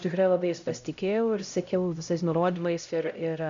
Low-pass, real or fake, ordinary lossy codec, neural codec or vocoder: 7.2 kHz; fake; MP3, 64 kbps; codec, 16 kHz, 0.5 kbps, X-Codec, HuBERT features, trained on LibriSpeech